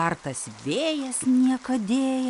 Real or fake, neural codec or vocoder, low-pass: real; none; 10.8 kHz